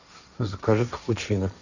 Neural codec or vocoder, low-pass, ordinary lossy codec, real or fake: codec, 16 kHz, 1.1 kbps, Voila-Tokenizer; 7.2 kHz; none; fake